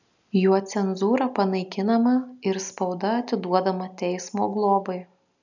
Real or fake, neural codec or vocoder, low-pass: real; none; 7.2 kHz